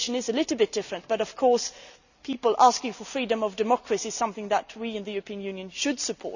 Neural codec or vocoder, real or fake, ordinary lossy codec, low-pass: none; real; none; 7.2 kHz